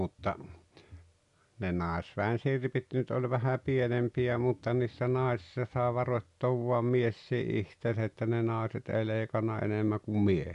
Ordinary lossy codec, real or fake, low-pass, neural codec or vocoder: none; real; 10.8 kHz; none